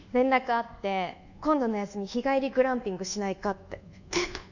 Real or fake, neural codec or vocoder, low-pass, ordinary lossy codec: fake; codec, 24 kHz, 1.2 kbps, DualCodec; 7.2 kHz; none